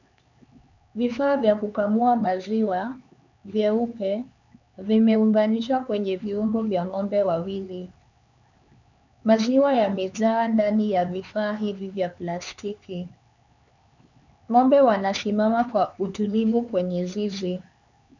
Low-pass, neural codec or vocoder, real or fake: 7.2 kHz; codec, 16 kHz, 4 kbps, X-Codec, HuBERT features, trained on LibriSpeech; fake